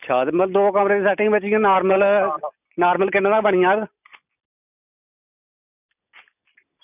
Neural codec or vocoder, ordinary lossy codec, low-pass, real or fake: none; none; 3.6 kHz; real